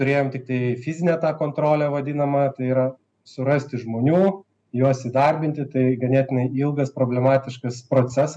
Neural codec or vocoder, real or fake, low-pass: none; real; 9.9 kHz